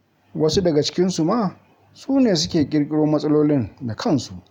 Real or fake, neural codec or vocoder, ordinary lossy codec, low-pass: real; none; none; 19.8 kHz